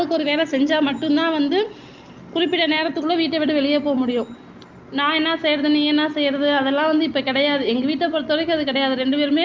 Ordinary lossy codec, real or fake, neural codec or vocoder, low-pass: Opus, 24 kbps; real; none; 7.2 kHz